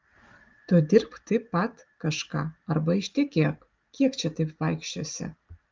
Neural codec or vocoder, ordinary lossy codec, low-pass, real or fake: none; Opus, 32 kbps; 7.2 kHz; real